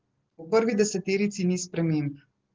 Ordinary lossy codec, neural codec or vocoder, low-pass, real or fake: Opus, 16 kbps; none; 7.2 kHz; real